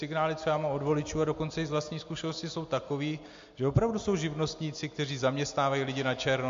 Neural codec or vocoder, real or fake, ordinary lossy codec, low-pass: none; real; MP3, 48 kbps; 7.2 kHz